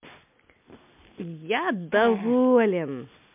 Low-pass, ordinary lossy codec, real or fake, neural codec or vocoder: 3.6 kHz; MP3, 32 kbps; real; none